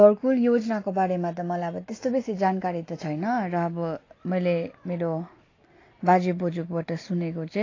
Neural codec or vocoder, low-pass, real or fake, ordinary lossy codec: none; 7.2 kHz; real; AAC, 32 kbps